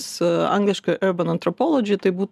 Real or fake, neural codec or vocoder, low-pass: real; none; 14.4 kHz